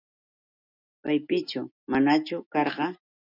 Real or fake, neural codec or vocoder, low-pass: real; none; 5.4 kHz